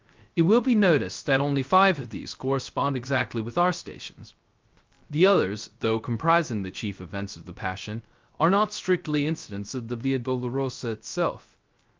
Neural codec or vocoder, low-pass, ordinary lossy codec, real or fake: codec, 16 kHz, 0.3 kbps, FocalCodec; 7.2 kHz; Opus, 24 kbps; fake